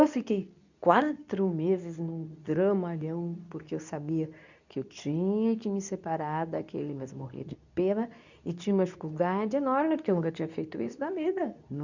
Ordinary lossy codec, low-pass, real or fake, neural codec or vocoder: none; 7.2 kHz; fake; codec, 24 kHz, 0.9 kbps, WavTokenizer, medium speech release version 2